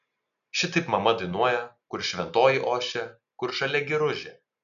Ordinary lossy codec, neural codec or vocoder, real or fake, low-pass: MP3, 96 kbps; none; real; 7.2 kHz